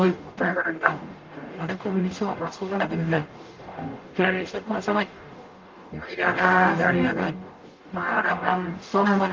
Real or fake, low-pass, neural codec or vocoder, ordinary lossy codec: fake; 7.2 kHz; codec, 44.1 kHz, 0.9 kbps, DAC; Opus, 32 kbps